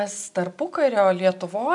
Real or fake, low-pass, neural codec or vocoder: real; 10.8 kHz; none